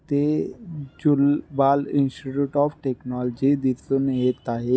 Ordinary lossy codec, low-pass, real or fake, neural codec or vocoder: none; none; real; none